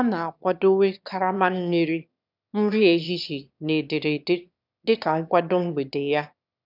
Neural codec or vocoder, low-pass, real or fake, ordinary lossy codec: autoencoder, 22.05 kHz, a latent of 192 numbers a frame, VITS, trained on one speaker; 5.4 kHz; fake; none